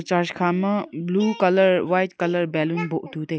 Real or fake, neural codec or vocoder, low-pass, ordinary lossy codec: real; none; none; none